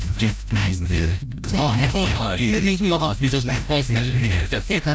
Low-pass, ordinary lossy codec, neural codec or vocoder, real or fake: none; none; codec, 16 kHz, 0.5 kbps, FreqCodec, larger model; fake